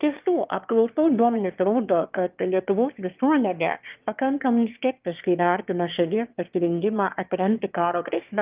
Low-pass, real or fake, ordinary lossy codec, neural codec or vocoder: 3.6 kHz; fake; Opus, 24 kbps; autoencoder, 22.05 kHz, a latent of 192 numbers a frame, VITS, trained on one speaker